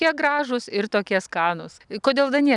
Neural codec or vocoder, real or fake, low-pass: none; real; 10.8 kHz